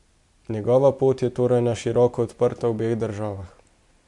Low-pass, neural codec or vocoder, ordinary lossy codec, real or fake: 10.8 kHz; none; MP3, 64 kbps; real